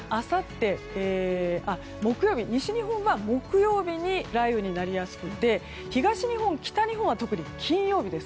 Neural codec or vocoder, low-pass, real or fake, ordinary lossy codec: none; none; real; none